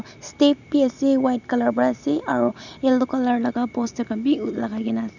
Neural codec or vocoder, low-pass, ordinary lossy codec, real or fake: none; 7.2 kHz; none; real